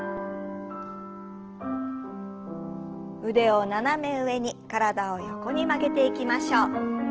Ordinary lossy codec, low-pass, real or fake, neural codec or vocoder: Opus, 16 kbps; 7.2 kHz; real; none